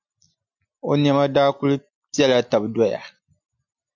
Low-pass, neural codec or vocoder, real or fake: 7.2 kHz; none; real